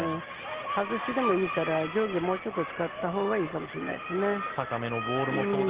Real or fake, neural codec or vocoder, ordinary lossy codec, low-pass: real; none; Opus, 16 kbps; 3.6 kHz